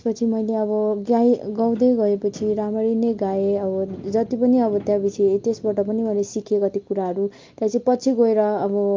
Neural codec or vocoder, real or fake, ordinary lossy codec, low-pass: none; real; Opus, 24 kbps; 7.2 kHz